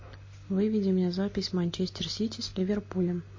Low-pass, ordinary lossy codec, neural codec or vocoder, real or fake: 7.2 kHz; MP3, 32 kbps; none; real